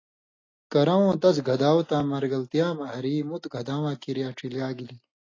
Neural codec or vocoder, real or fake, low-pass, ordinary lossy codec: none; real; 7.2 kHz; AAC, 32 kbps